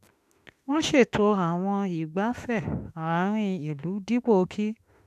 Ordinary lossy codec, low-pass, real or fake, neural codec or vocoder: none; 14.4 kHz; fake; autoencoder, 48 kHz, 32 numbers a frame, DAC-VAE, trained on Japanese speech